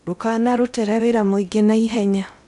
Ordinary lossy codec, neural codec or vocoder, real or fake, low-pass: none; codec, 16 kHz in and 24 kHz out, 0.8 kbps, FocalCodec, streaming, 65536 codes; fake; 10.8 kHz